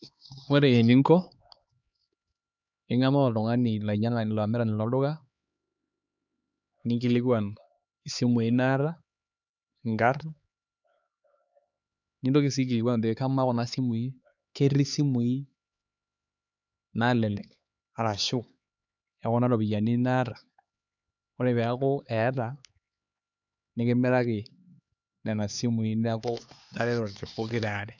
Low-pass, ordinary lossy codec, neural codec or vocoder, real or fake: 7.2 kHz; none; codec, 16 kHz, 4 kbps, X-Codec, HuBERT features, trained on LibriSpeech; fake